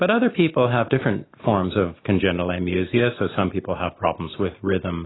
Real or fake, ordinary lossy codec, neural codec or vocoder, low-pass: real; AAC, 16 kbps; none; 7.2 kHz